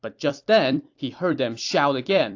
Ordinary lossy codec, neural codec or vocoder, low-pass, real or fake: AAC, 48 kbps; vocoder, 44.1 kHz, 128 mel bands every 256 samples, BigVGAN v2; 7.2 kHz; fake